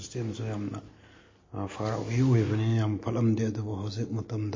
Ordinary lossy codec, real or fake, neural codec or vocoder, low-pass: MP3, 32 kbps; real; none; 7.2 kHz